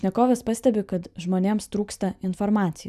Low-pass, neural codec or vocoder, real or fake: 14.4 kHz; none; real